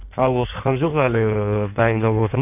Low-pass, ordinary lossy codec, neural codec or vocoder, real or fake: 3.6 kHz; none; codec, 16 kHz in and 24 kHz out, 1.1 kbps, FireRedTTS-2 codec; fake